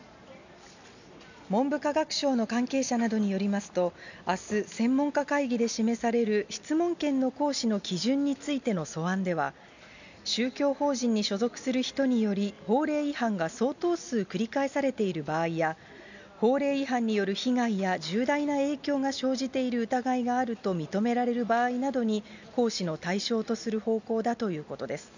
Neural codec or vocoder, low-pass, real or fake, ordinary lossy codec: none; 7.2 kHz; real; none